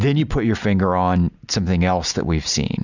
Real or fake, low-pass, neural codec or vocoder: real; 7.2 kHz; none